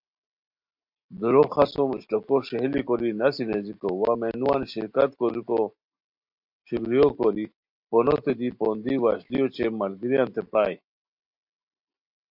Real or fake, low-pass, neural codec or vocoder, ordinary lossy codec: real; 5.4 kHz; none; MP3, 48 kbps